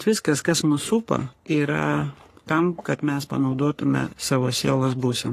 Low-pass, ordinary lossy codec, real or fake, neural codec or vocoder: 14.4 kHz; AAC, 48 kbps; fake; codec, 44.1 kHz, 3.4 kbps, Pupu-Codec